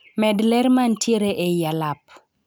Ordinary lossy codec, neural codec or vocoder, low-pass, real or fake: none; none; none; real